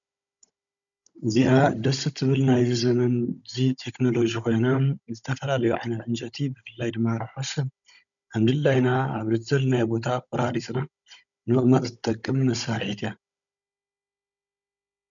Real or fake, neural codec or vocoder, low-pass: fake; codec, 16 kHz, 16 kbps, FunCodec, trained on Chinese and English, 50 frames a second; 7.2 kHz